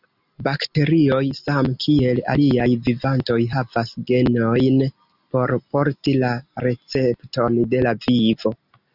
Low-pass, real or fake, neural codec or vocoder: 5.4 kHz; real; none